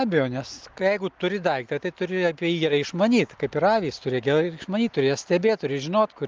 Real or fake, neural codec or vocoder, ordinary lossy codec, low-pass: real; none; Opus, 32 kbps; 7.2 kHz